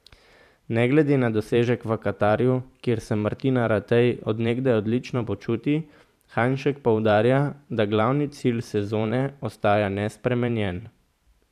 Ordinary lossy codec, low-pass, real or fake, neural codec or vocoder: none; 14.4 kHz; fake; vocoder, 44.1 kHz, 128 mel bands every 512 samples, BigVGAN v2